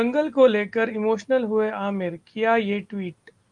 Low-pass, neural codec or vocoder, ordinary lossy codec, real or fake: 10.8 kHz; none; Opus, 32 kbps; real